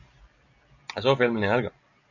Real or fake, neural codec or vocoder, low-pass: real; none; 7.2 kHz